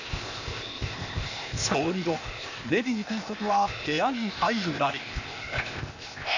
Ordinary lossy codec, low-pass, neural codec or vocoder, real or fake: none; 7.2 kHz; codec, 16 kHz, 0.8 kbps, ZipCodec; fake